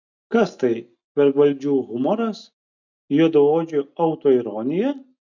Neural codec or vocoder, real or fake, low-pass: none; real; 7.2 kHz